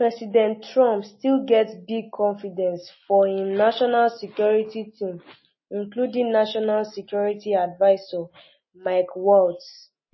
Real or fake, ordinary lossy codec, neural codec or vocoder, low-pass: real; MP3, 24 kbps; none; 7.2 kHz